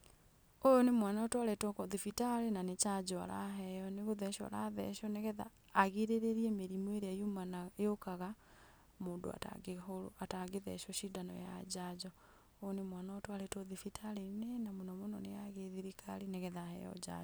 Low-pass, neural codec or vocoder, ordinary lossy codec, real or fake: none; none; none; real